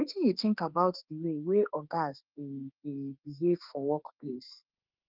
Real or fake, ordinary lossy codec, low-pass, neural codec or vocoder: fake; Opus, 32 kbps; 5.4 kHz; autoencoder, 48 kHz, 32 numbers a frame, DAC-VAE, trained on Japanese speech